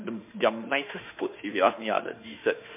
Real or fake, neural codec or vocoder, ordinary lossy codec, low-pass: fake; autoencoder, 48 kHz, 32 numbers a frame, DAC-VAE, trained on Japanese speech; MP3, 32 kbps; 3.6 kHz